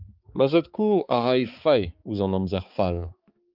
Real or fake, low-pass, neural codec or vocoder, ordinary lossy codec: fake; 5.4 kHz; codec, 16 kHz, 4 kbps, X-Codec, HuBERT features, trained on balanced general audio; Opus, 24 kbps